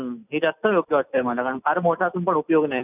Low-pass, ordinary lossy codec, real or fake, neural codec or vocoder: 3.6 kHz; none; real; none